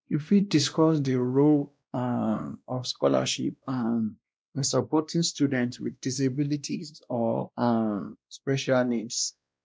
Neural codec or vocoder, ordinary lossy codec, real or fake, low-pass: codec, 16 kHz, 1 kbps, X-Codec, WavLM features, trained on Multilingual LibriSpeech; none; fake; none